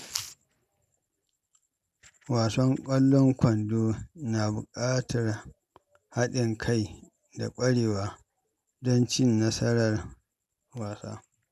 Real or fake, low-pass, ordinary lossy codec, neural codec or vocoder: real; 14.4 kHz; none; none